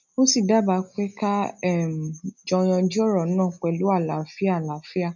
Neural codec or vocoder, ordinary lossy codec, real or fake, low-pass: none; none; real; 7.2 kHz